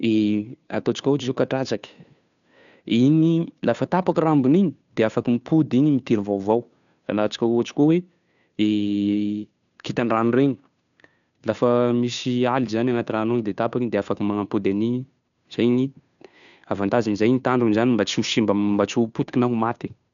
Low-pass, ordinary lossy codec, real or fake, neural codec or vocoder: 7.2 kHz; none; fake; codec, 16 kHz, 2 kbps, FunCodec, trained on Chinese and English, 25 frames a second